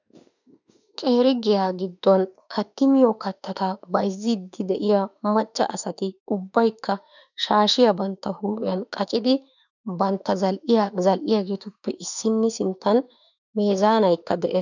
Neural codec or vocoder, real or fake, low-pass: autoencoder, 48 kHz, 32 numbers a frame, DAC-VAE, trained on Japanese speech; fake; 7.2 kHz